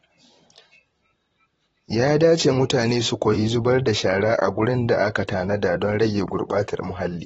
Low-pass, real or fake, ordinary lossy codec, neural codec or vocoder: 19.8 kHz; fake; AAC, 24 kbps; vocoder, 44.1 kHz, 128 mel bands, Pupu-Vocoder